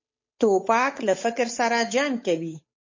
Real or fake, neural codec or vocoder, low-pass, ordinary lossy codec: fake; codec, 16 kHz, 8 kbps, FunCodec, trained on Chinese and English, 25 frames a second; 7.2 kHz; MP3, 32 kbps